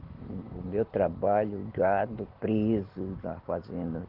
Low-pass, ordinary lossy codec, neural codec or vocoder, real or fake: 5.4 kHz; Opus, 16 kbps; none; real